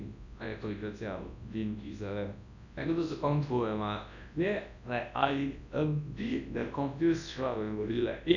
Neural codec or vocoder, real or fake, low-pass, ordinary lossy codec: codec, 24 kHz, 0.9 kbps, WavTokenizer, large speech release; fake; 7.2 kHz; none